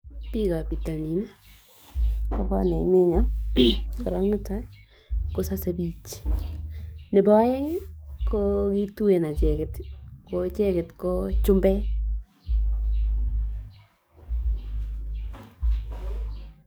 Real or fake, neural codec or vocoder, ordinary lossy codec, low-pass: fake; codec, 44.1 kHz, 7.8 kbps, DAC; none; none